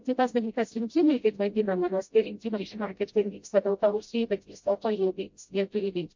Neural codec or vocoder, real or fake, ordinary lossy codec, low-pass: codec, 16 kHz, 0.5 kbps, FreqCodec, smaller model; fake; MP3, 48 kbps; 7.2 kHz